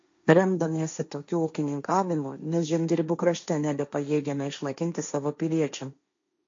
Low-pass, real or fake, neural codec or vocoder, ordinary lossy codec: 7.2 kHz; fake; codec, 16 kHz, 1.1 kbps, Voila-Tokenizer; MP3, 48 kbps